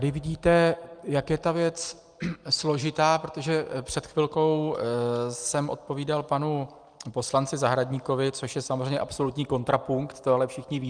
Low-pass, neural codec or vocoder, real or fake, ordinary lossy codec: 9.9 kHz; none; real; Opus, 32 kbps